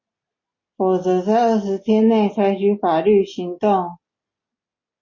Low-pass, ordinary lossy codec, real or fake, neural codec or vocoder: 7.2 kHz; MP3, 32 kbps; real; none